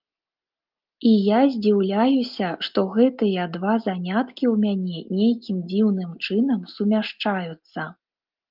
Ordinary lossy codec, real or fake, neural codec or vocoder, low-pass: Opus, 32 kbps; real; none; 5.4 kHz